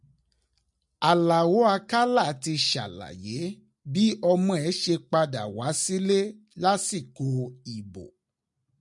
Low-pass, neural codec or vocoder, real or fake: 10.8 kHz; none; real